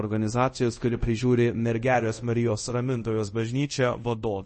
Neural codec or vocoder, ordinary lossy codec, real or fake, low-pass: codec, 24 kHz, 0.5 kbps, DualCodec; MP3, 32 kbps; fake; 10.8 kHz